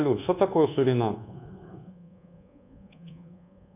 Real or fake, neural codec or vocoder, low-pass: fake; codec, 24 kHz, 1.2 kbps, DualCodec; 3.6 kHz